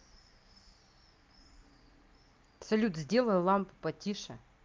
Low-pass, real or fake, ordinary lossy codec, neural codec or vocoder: 7.2 kHz; real; Opus, 24 kbps; none